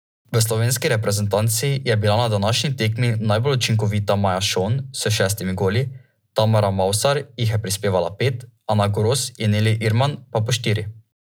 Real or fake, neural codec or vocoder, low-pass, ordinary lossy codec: real; none; none; none